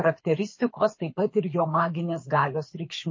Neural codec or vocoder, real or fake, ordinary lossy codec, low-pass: codec, 24 kHz, 6 kbps, HILCodec; fake; MP3, 32 kbps; 7.2 kHz